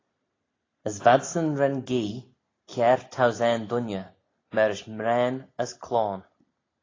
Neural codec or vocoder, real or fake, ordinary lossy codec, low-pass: none; real; AAC, 32 kbps; 7.2 kHz